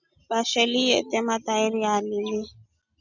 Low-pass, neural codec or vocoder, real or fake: 7.2 kHz; none; real